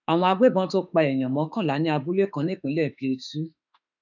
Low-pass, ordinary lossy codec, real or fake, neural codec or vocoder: 7.2 kHz; none; fake; autoencoder, 48 kHz, 32 numbers a frame, DAC-VAE, trained on Japanese speech